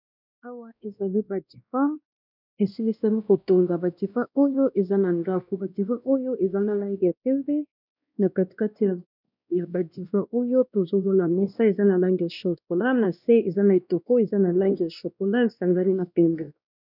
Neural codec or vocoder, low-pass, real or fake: codec, 16 kHz, 1 kbps, X-Codec, WavLM features, trained on Multilingual LibriSpeech; 5.4 kHz; fake